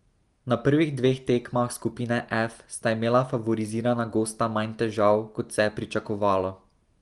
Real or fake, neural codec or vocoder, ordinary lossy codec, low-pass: real; none; Opus, 24 kbps; 10.8 kHz